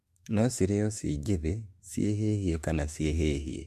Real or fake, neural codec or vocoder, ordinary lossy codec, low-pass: fake; autoencoder, 48 kHz, 32 numbers a frame, DAC-VAE, trained on Japanese speech; MP3, 64 kbps; 14.4 kHz